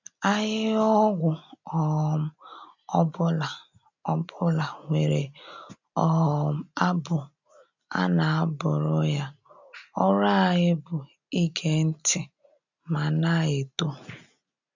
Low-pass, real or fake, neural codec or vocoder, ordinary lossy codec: 7.2 kHz; real; none; none